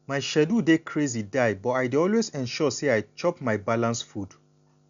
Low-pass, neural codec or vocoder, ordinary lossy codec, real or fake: 7.2 kHz; none; none; real